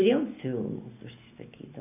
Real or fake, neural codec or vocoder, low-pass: real; none; 3.6 kHz